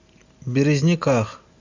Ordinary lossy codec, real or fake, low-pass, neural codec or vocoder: AAC, 48 kbps; real; 7.2 kHz; none